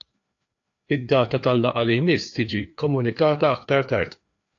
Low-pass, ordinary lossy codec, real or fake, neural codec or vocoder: 7.2 kHz; AAC, 48 kbps; fake; codec, 16 kHz, 2 kbps, FreqCodec, larger model